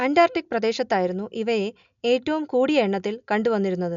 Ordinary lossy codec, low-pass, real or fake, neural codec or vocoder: none; 7.2 kHz; real; none